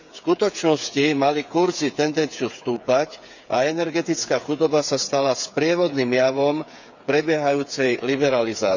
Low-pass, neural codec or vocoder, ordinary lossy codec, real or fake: 7.2 kHz; codec, 16 kHz, 8 kbps, FreqCodec, smaller model; none; fake